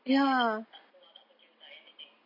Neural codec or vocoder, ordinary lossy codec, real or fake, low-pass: none; MP3, 32 kbps; real; 5.4 kHz